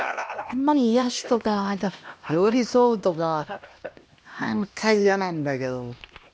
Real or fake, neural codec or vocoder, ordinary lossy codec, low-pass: fake; codec, 16 kHz, 1 kbps, X-Codec, HuBERT features, trained on LibriSpeech; none; none